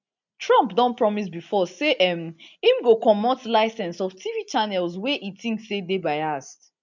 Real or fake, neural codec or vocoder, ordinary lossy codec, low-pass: real; none; none; 7.2 kHz